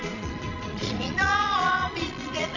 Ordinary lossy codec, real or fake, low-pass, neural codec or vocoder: none; fake; 7.2 kHz; vocoder, 22.05 kHz, 80 mel bands, Vocos